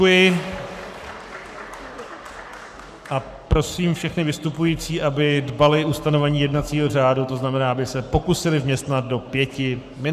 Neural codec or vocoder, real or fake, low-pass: codec, 44.1 kHz, 7.8 kbps, Pupu-Codec; fake; 14.4 kHz